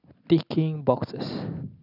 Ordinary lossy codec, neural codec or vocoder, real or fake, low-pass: none; none; real; 5.4 kHz